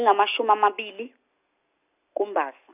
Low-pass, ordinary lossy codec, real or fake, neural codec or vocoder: 3.6 kHz; none; real; none